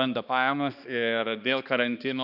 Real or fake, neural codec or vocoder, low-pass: fake; codec, 16 kHz, 2 kbps, X-Codec, HuBERT features, trained on balanced general audio; 5.4 kHz